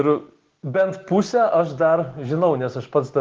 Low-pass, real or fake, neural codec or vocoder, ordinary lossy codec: 7.2 kHz; real; none; Opus, 16 kbps